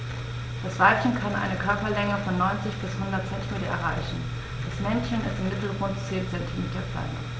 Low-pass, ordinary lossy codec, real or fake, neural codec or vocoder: none; none; real; none